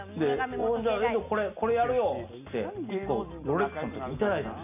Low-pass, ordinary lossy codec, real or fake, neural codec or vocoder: 3.6 kHz; none; real; none